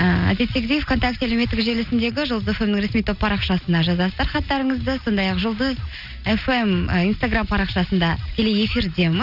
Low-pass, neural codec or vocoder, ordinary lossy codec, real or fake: 5.4 kHz; none; none; real